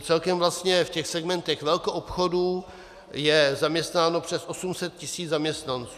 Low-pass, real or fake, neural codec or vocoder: 14.4 kHz; real; none